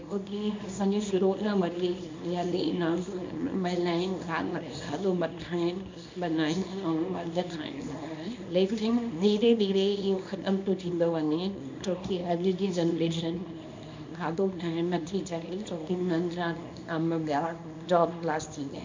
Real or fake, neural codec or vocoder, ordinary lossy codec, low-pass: fake; codec, 24 kHz, 0.9 kbps, WavTokenizer, small release; MP3, 64 kbps; 7.2 kHz